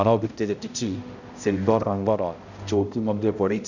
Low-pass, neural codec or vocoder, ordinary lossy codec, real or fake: 7.2 kHz; codec, 16 kHz, 0.5 kbps, X-Codec, HuBERT features, trained on balanced general audio; none; fake